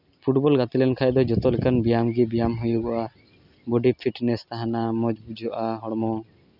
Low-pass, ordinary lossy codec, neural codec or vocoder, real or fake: 5.4 kHz; none; none; real